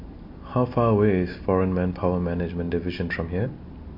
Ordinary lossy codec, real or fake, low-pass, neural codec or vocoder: MP3, 32 kbps; real; 5.4 kHz; none